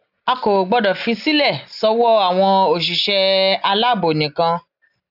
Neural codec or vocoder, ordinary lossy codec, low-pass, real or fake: none; none; 5.4 kHz; real